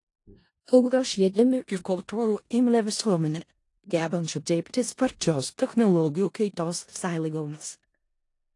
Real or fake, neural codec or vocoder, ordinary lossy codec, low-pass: fake; codec, 16 kHz in and 24 kHz out, 0.4 kbps, LongCat-Audio-Codec, four codebook decoder; AAC, 48 kbps; 10.8 kHz